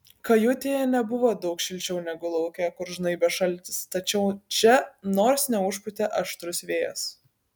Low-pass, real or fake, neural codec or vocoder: 19.8 kHz; real; none